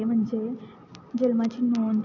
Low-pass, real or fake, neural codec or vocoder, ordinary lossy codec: 7.2 kHz; real; none; AAC, 48 kbps